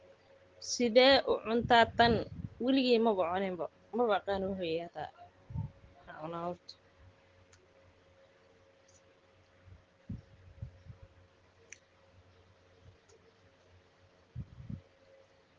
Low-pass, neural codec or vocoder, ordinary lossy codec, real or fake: 7.2 kHz; none; Opus, 16 kbps; real